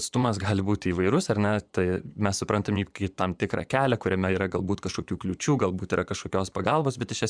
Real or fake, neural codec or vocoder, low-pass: fake; vocoder, 24 kHz, 100 mel bands, Vocos; 9.9 kHz